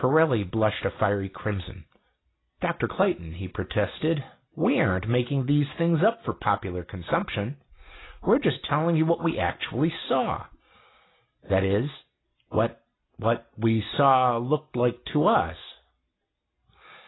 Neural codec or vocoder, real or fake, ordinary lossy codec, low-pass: none; real; AAC, 16 kbps; 7.2 kHz